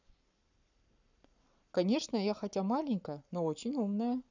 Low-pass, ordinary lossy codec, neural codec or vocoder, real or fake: 7.2 kHz; none; codec, 44.1 kHz, 7.8 kbps, Pupu-Codec; fake